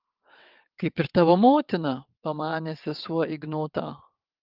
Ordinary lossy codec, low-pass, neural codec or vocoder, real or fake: Opus, 32 kbps; 5.4 kHz; vocoder, 22.05 kHz, 80 mel bands, WaveNeXt; fake